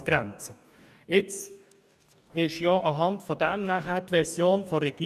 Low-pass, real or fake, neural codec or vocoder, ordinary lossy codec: 14.4 kHz; fake; codec, 44.1 kHz, 2.6 kbps, DAC; none